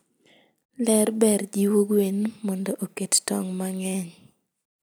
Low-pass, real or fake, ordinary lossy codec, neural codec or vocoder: none; real; none; none